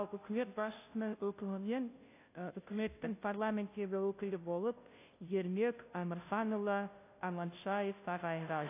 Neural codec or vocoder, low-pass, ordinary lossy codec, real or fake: codec, 16 kHz, 0.5 kbps, FunCodec, trained on Chinese and English, 25 frames a second; 3.6 kHz; AAC, 24 kbps; fake